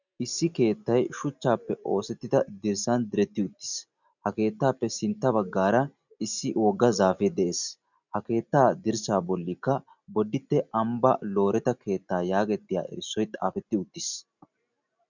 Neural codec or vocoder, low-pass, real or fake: none; 7.2 kHz; real